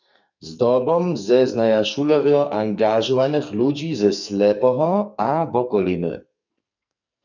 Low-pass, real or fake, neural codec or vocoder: 7.2 kHz; fake; codec, 44.1 kHz, 2.6 kbps, SNAC